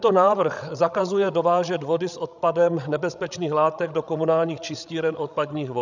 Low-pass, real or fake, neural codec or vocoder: 7.2 kHz; fake; codec, 16 kHz, 16 kbps, FreqCodec, larger model